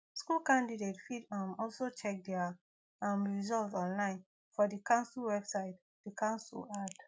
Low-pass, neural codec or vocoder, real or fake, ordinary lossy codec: none; none; real; none